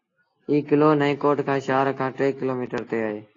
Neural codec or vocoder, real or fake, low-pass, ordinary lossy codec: none; real; 7.2 kHz; AAC, 32 kbps